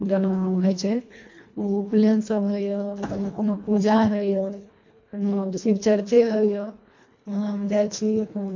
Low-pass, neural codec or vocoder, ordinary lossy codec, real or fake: 7.2 kHz; codec, 24 kHz, 1.5 kbps, HILCodec; MP3, 48 kbps; fake